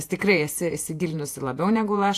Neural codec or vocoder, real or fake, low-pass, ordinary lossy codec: vocoder, 48 kHz, 128 mel bands, Vocos; fake; 14.4 kHz; AAC, 64 kbps